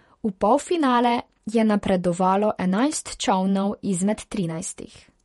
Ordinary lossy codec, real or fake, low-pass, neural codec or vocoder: MP3, 48 kbps; fake; 19.8 kHz; vocoder, 48 kHz, 128 mel bands, Vocos